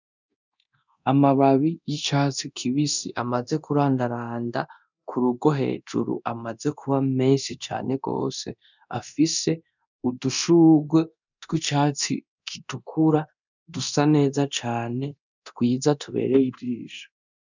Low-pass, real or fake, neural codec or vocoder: 7.2 kHz; fake; codec, 24 kHz, 0.9 kbps, DualCodec